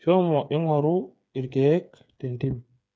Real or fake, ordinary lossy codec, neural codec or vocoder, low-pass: fake; none; codec, 16 kHz, 8 kbps, FreqCodec, smaller model; none